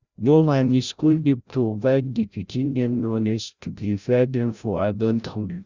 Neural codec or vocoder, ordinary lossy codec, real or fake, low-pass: codec, 16 kHz, 0.5 kbps, FreqCodec, larger model; Opus, 64 kbps; fake; 7.2 kHz